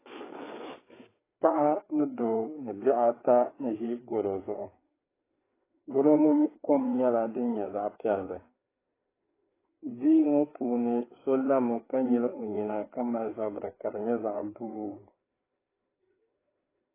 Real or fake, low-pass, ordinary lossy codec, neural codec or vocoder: fake; 3.6 kHz; AAC, 16 kbps; codec, 16 kHz, 4 kbps, FreqCodec, larger model